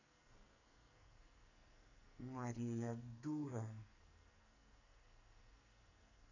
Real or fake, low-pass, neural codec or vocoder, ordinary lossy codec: fake; 7.2 kHz; codec, 44.1 kHz, 2.6 kbps, SNAC; none